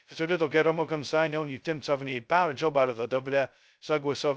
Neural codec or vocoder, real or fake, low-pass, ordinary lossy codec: codec, 16 kHz, 0.2 kbps, FocalCodec; fake; none; none